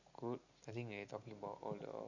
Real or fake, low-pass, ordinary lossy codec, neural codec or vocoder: real; 7.2 kHz; Opus, 64 kbps; none